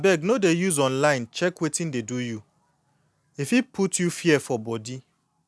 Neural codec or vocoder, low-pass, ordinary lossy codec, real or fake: none; none; none; real